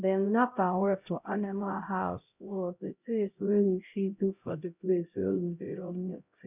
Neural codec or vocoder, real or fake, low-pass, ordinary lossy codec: codec, 16 kHz, 0.5 kbps, X-Codec, HuBERT features, trained on LibriSpeech; fake; 3.6 kHz; none